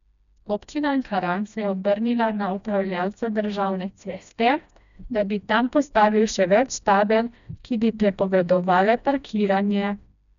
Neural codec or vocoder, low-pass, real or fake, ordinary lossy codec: codec, 16 kHz, 1 kbps, FreqCodec, smaller model; 7.2 kHz; fake; none